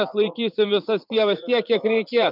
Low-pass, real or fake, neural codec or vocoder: 5.4 kHz; fake; vocoder, 44.1 kHz, 80 mel bands, Vocos